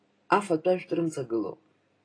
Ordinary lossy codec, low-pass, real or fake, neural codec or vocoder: AAC, 32 kbps; 9.9 kHz; real; none